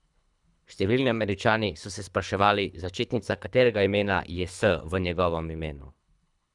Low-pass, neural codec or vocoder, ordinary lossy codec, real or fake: 10.8 kHz; codec, 24 kHz, 3 kbps, HILCodec; none; fake